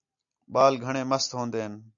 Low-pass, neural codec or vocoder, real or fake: 7.2 kHz; none; real